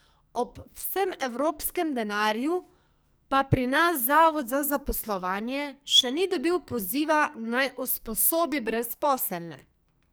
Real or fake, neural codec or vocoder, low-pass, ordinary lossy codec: fake; codec, 44.1 kHz, 2.6 kbps, SNAC; none; none